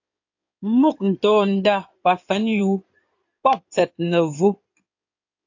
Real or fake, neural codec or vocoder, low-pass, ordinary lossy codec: fake; codec, 16 kHz in and 24 kHz out, 2.2 kbps, FireRedTTS-2 codec; 7.2 kHz; AAC, 48 kbps